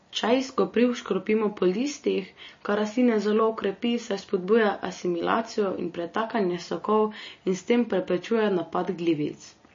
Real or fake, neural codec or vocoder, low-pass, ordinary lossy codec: real; none; 7.2 kHz; MP3, 32 kbps